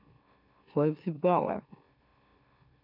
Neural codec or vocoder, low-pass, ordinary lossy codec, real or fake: autoencoder, 44.1 kHz, a latent of 192 numbers a frame, MeloTTS; 5.4 kHz; none; fake